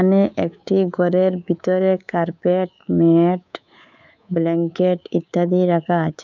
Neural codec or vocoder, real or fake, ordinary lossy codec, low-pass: codec, 24 kHz, 3.1 kbps, DualCodec; fake; none; 7.2 kHz